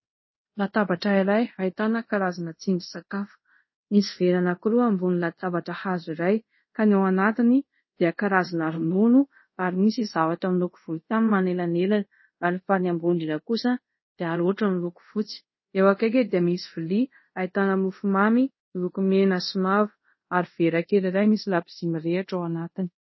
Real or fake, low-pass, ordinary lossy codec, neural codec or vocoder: fake; 7.2 kHz; MP3, 24 kbps; codec, 24 kHz, 0.5 kbps, DualCodec